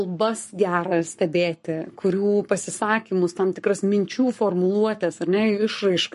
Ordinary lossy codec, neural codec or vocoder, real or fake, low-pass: MP3, 48 kbps; codec, 44.1 kHz, 7.8 kbps, Pupu-Codec; fake; 14.4 kHz